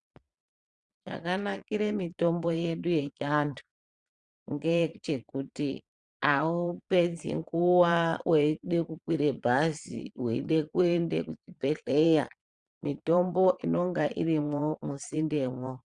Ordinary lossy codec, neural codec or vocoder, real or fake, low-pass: Opus, 64 kbps; vocoder, 22.05 kHz, 80 mel bands, Vocos; fake; 9.9 kHz